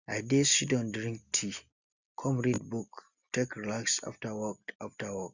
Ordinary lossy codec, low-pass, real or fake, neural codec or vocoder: Opus, 64 kbps; 7.2 kHz; real; none